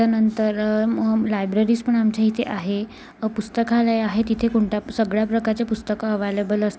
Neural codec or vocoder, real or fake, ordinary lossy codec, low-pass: none; real; none; none